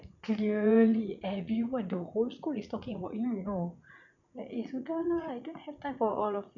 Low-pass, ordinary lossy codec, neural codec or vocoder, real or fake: 7.2 kHz; none; vocoder, 44.1 kHz, 80 mel bands, Vocos; fake